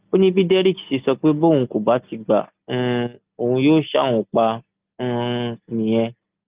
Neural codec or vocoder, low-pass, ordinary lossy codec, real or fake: none; 3.6 kHz; Opus, 32 kbps; real